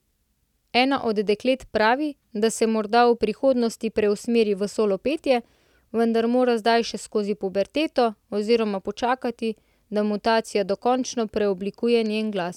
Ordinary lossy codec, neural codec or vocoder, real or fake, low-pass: none; none; real; 19.8 kHz